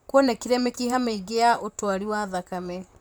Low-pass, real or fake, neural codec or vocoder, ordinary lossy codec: none; fake; vocoder, 44.1 kHz, 128 mel bands, Pupu-Vocoder; none